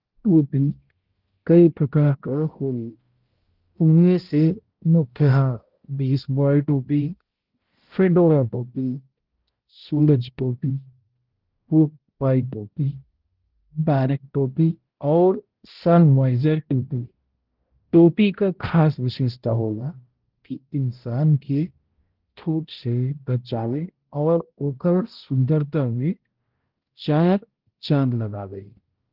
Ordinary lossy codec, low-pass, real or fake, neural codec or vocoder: Opus, 16 kbps; 5.4 kHz; fake; codec, 16 kHz, 0.5 kbps, X-Codec, HuBERT features, trained on balanced general audio